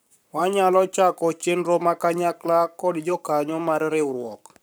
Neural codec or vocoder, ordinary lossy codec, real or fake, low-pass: codec, 44.1 kHz, 7.8 kbps, Pupu-Codec; none; fake; none